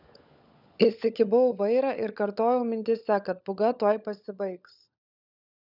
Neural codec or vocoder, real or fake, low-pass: codec, 16 kHz, 16 kbps, FunCodec, trained on LibriTTS, 50 frames a second; fake; 5.4 kHz